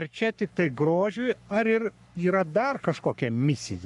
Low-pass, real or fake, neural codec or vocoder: 10.8 kHz; fake; codec, 44.1 kHz, 3.4 kbps, Pupu-Codec